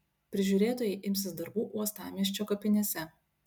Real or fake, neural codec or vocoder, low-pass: real; none; 19.8 kHz